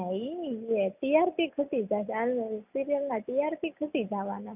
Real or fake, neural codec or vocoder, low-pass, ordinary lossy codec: real; none; 3.6 kHz; none